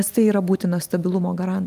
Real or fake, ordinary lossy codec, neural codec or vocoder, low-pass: real; Opus, 32 kbps; none; 14.4 kHz